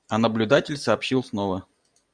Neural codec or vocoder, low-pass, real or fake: none; 9.9 kHz; real